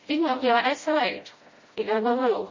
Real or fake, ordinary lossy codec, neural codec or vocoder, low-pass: fake; MP3, 32 kbps; codec, 16 kHz, 0.5 kbps, FreqCodec, smaller model; 7.2 kHz